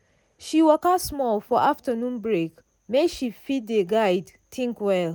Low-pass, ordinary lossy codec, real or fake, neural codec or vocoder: none; none; real; none